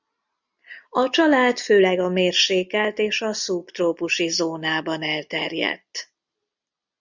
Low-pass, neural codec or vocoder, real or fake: 7.2 kHz; none; real